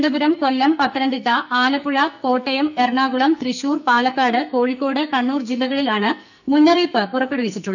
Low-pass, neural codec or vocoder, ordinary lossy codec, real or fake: 7.2 kHz; codec, 44.1 kHz, 2.6 kbps, SNAC; none; fake